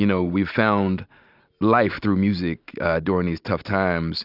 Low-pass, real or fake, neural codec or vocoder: 5.4 kHz; real; none